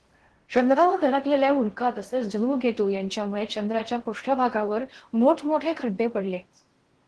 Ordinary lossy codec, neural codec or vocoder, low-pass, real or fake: Opus, 16 kbps; codec, 16 kHz in and 24 kHz out, 0.6 kbps, FocalCodec, streaming, 4096 codes; 10.8 kHz; fake